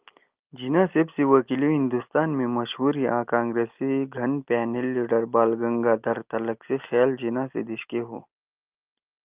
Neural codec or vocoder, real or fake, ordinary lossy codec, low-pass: none; real; Opus, 32 kbps; 3.6 kHz